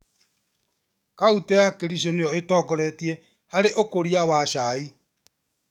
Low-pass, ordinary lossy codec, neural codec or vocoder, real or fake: 19.8 kHz; none; codec, 44.1 kHz, 7.8 kbps, DAC; fake